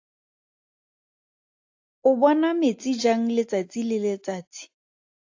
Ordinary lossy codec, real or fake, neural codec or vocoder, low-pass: AAC, 48 kbps; real; none; 7.2 kHz